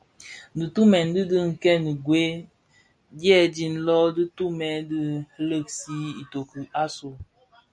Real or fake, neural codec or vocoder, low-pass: real; none; 9.9 kHz